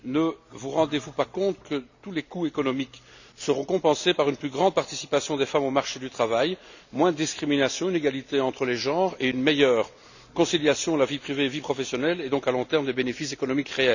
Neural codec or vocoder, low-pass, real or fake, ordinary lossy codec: none; 7.2 kHz; real; none